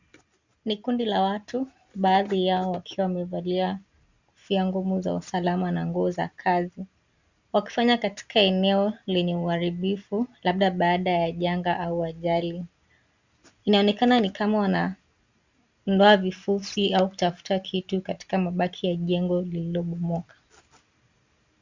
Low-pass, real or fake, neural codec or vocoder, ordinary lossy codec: 7.2 kHz; real; none; Opus, 64 kbps